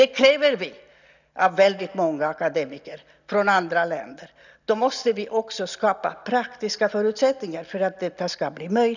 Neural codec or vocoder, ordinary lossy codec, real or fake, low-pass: none; none; real; 7.2 kHz